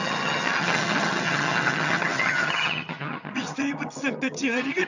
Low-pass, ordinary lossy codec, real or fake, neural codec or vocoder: 7.2 kHz; none; fake; vocoder, 22.05 kHz, 80 mel bands, HiFi-GAN